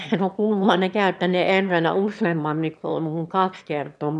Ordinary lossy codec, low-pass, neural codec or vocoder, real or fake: none; none; autoencoder, 22.05 kHz, a latent of 192 numbers a frame, VITS, trained on one speaker; fake